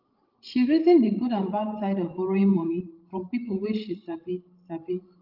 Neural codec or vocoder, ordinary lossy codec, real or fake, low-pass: codec, 16 kHz, 16 kbps, FreqCodec, larger model; Opus, 32 kbps; fake; 5.4 kHz